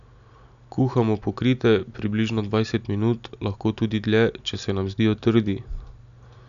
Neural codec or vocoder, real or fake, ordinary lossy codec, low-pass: none; real; none; 7.2 kHz